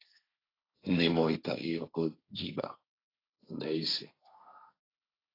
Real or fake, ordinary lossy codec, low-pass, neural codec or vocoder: fake; AAC, 24 kbps; 5.4 kHz; codec, 16 kHz, 1.1 kbps, Voila-Tokenizer